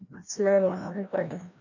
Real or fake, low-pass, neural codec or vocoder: fake; 7.2 kHz; codec, 16 kHz, 1 kbps, FreqCodec, larger model